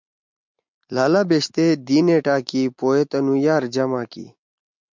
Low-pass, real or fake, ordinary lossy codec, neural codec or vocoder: 7.2 kHz; real; MP3, 64 kbps; none